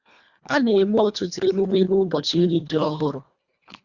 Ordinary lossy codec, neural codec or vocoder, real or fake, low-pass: Opus, 64 kbps; codec, 24 kHz, 1.5 kbps, HILCodec; fake; 7.2 kHz